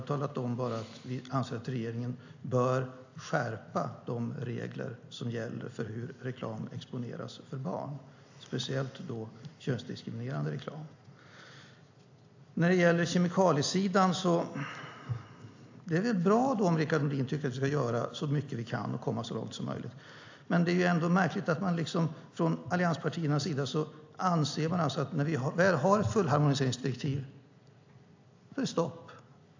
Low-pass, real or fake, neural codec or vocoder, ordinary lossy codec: 7.2 kHz; real; none; AAC, 48 kbps